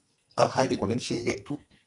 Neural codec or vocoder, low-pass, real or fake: codec, 44.1 kHz, 2.6 kbps, SNAC; 10.8 kHz; fake